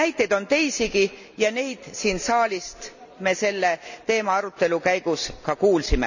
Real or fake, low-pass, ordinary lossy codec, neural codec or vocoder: real; 7.2 kHz; none; none